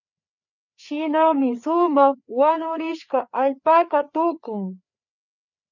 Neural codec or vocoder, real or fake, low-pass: codec, 16 kHz, 4 kbps, FreqCodec, larger model; fake; 7.2 kHz